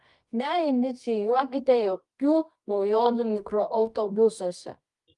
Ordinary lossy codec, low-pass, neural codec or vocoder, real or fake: Opus, 32 kbps; 10.8 kHz; codec, 24 kHz, 0.9 kbps, WavTokenizer, medium music audio release; fake